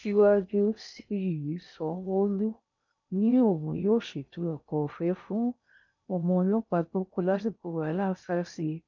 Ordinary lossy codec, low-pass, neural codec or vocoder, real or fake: none; 7.2 kHz; codec, 16 kHz in and 24 kHz out, 0.6 kbps, FocalCodec, streaming, 4096 codes; fake